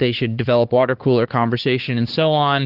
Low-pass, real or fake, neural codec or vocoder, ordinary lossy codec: 5.4 kHz; fake; codec, 16 kHz, 4 kbps, X-Codec, HuBERT features, trained on LibriSpeech; Opus, 16 kbps